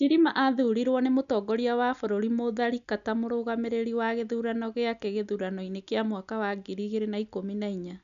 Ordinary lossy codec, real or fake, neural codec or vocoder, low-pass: none; real; none; 7.2 kHz